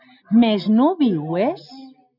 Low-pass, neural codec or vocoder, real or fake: 5.4 kHz; none; real